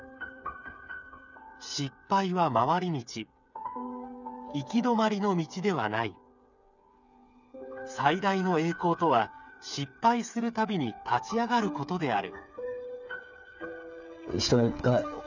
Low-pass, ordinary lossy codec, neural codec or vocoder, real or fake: 7.2 kHz; none; codec, 16 kHz, 8 kbps, FreqCodec, smaller model; fake